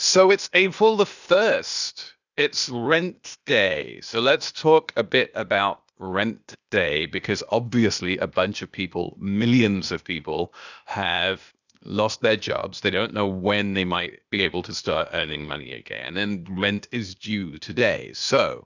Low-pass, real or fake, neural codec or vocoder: 7.2 kHz; fake; codec, 16 kHz, 0.8 kbps, ZipCodec